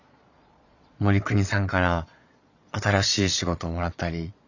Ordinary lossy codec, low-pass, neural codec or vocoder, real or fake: none; 7.2 kHz; vocoder, 22.05 kHz, 80 mel bands, Vocos; fake